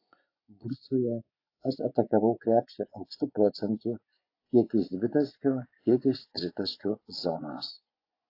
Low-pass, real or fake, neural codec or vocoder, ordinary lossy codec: 5.4 kHz; fake; vocoder, 24 kHz, 100 mel bands, Vocos; AAC, 32 kbps